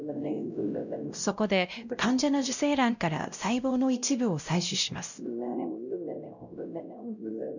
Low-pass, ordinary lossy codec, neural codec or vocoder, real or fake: 7.2 kHz; none; codec, 16 kHz, 0.5 kbps, X-Codec, WavLM features, trained on Multilingual LibriSpeech; fake